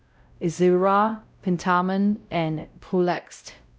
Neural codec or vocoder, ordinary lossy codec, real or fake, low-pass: codec, 16 kHz, 0.5 kbps, X-Codec, WavLM features, trained on Multilingual LibriSpeech; none; fake; none